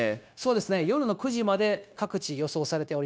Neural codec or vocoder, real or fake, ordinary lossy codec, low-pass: codec, 16 kHz, 0.9 kbps, LongCat-Audio-Codec; fake; none; none